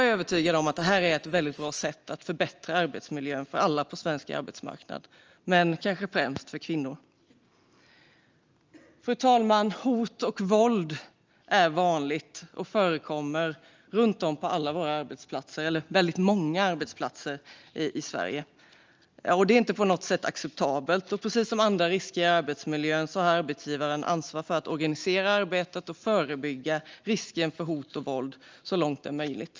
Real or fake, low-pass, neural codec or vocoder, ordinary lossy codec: real; 7.2 kHz; none; Opus, 24 kbps